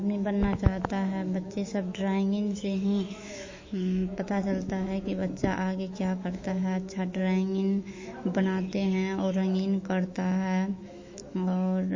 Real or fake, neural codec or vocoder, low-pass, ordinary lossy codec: fake; autoencoder, 48 kHz, 128 numbers a frame, DAC-VAE, trained on Japanese speech; 7.2 kHz; MP3, 32 kbps